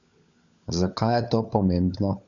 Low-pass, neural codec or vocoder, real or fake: 7.2 kHz; codec, 16 kHz, 16 kbps, FunCodec, trained on LibriTTS, 50 frames a second; fake